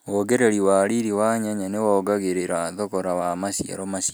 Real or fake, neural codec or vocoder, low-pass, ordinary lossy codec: real; none; none; none